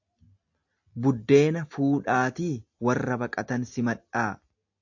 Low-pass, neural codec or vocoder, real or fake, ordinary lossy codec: 7.2 kHz; none; real; AAC, 48 kbps